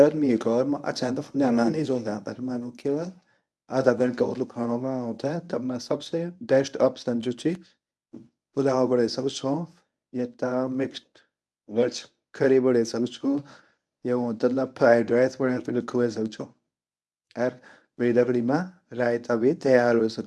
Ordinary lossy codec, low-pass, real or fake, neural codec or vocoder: none; none; fake; codec, 24 kHz, 0.9 kbps, WavTokenizer, medium speech release version 1